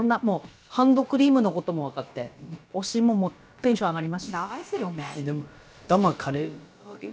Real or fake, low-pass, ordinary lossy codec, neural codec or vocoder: fake; none; none; codec, 16 kHz, about 1 kbps, DyCAST, with the encoder's durations